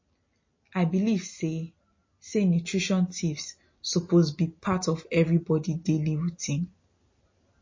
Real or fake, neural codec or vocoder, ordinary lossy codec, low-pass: real; none; MP3, 32 kbps; 7.2 kHz